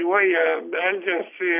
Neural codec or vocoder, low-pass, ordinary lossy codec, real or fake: none; 3.6 kHz; AAC, 32 kbps; real